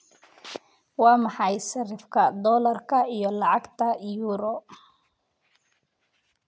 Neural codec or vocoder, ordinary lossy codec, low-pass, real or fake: none; none; none; real